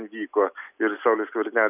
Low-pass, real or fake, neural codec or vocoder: 3.6 kHz; real; none